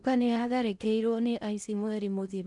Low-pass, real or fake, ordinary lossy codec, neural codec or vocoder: 10.8 kHz; fake; none; codec, 16 kHz in and 24 kHz out, 0.6 kbps, FocalCodec, streaming, 4096 codes